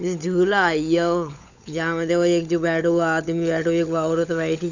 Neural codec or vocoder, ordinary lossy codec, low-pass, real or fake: codec, 16 kHz, 16 kbps, FunCodec, trained on LibriTTS, 50 frames a second; none; 7.2 kHz; fake